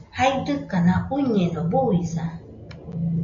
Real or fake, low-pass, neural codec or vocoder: real; 7.2 kHz; none